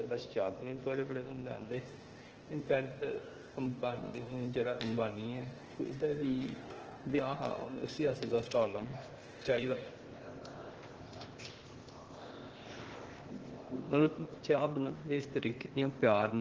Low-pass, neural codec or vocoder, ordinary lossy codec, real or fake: 7.2 kHz; codec, 16 kHz, 0.8 kbps, ZipCodec; Opus, 16 kbps; fake